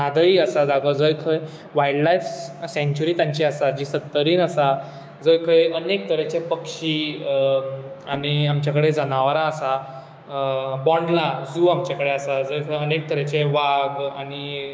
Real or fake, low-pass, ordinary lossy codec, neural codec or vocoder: fake; none; none; codec, 16 kHz, 6 kbps, DAC